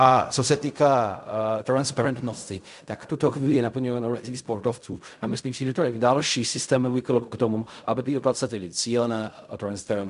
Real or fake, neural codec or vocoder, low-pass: fake; codec, 16 kHz in and 24 kHz out, 0.4 kbps, LongCat-Audio-Codec, fine tuned four codebook decoder; 10.8 kHz